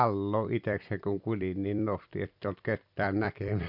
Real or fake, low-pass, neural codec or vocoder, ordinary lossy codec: fake; 5.4 kHz; vocoder, 44.1 kHz, 128 mel bands every 256 samples, BigVGAN v2; none